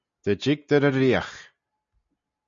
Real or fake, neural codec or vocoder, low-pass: real; none; 7.2 kHz